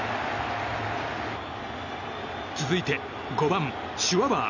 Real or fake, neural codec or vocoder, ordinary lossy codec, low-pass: real; none; none; 7.2 kHz